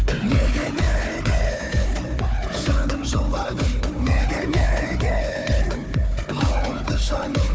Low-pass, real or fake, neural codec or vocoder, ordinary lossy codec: none; fake; codec, 16 kHz, 4 kbps, FunCodec, trained on Chinese and English, 50 frames a second; none